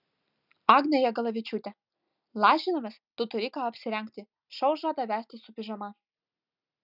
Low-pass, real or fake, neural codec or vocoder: 5.4 kHz; real; none